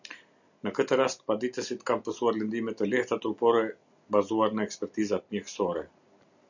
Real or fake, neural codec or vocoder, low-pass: real; none; 7.2 kHz